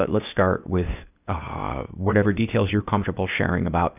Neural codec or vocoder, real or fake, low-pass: codec, 16 kHz, 0.8 kbps, ZipCodec; fake; 3.6 kHz